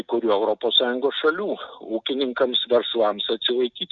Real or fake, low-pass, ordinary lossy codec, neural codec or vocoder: real; 7.2 kHz; Opus, 64 kbps; none